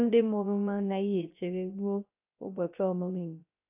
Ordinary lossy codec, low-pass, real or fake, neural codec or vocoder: AAC, 32 kbps; 3.6 kHz; fake; codec, 16 kHz, 0.3 kbps, FocalCodec